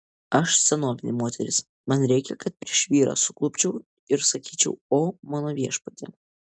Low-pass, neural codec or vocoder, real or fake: 9.9 kHz; none; real